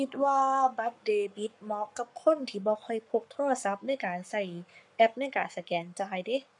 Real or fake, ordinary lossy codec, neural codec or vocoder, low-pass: fake; none; codec, 44.1 kHz, 7.8 kbps, Pupu-Codec; 10.8 kHz